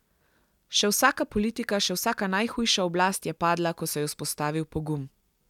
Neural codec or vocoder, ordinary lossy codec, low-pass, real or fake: none; none; 19.8 kHz; real